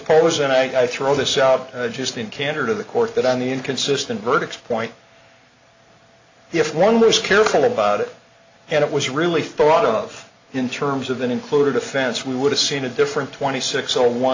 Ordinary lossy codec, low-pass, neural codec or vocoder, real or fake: AAC, 48 kbps; 7.2 kHz; none; real